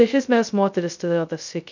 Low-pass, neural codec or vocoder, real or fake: 7.2 kHz; codec, 16 kHz, 0.2 kbps, FocalCodec; fake